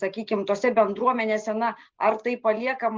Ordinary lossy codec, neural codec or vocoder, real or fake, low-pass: Opus, 32 kbps; none; real; 7.2 kHz